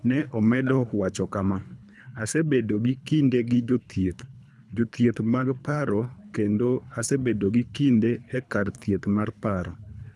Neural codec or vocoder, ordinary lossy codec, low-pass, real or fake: codec, 24 kHz, 3 kbps, HILCodec; none; none; fake